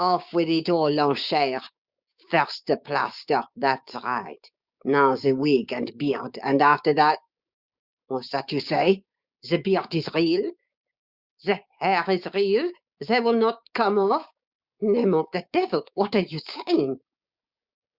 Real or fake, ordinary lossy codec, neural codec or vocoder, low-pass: fake; Opus, 64 kbps; codec, 16 kHz, 6 kbps, DAC; 5.4 kHz